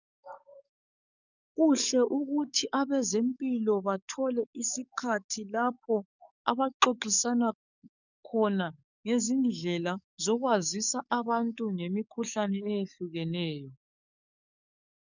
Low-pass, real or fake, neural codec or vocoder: 7.2 kHz; fake; codec, 44.1 kHz, 7.8 kbps, DAC